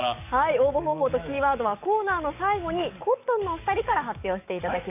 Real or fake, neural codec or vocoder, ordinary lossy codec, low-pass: fake; codec, 44.1 kHz, 7.8 kbps, DAC; none; 3.6 kHz